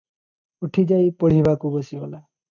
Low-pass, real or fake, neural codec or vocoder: 7.2 kHz; real; none